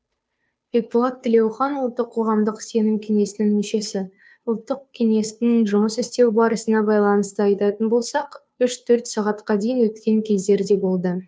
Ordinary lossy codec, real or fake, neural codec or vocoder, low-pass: none; fake; codec, 16 kHz, 2 kbps, FunCodec, trained on Chinese and English, 25 frames a second; none